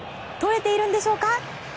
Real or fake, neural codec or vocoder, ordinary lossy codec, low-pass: real; none; none; none